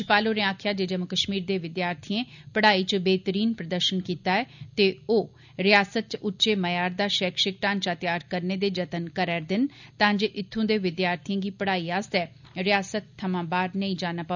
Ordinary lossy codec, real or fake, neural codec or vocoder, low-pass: none; real; none; 7.2 kHz